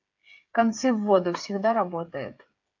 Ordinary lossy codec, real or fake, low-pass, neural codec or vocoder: AAC, 48 kbps; fake; 7.2 kHz; codec, 16 kHz, 8 kbps, FreqCodec, smaller model